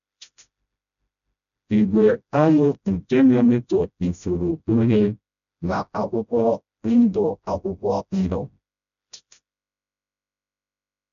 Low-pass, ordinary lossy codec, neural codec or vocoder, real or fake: 7.2 kHz; none; codec, 16 kHz, 0.5 kbps, FreqCodec, smaller model; fake